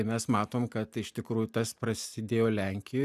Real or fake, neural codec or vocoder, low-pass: real; none; 14.4 kHz